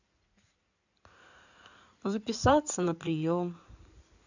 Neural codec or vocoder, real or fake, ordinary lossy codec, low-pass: codec, 16 kHz in and 24 kHz out, 2.2 kbps, FireRedTTS-2 codec; fake; none; 7.2 kHz